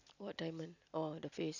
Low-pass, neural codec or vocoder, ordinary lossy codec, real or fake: 7.2 kHz; none; none; real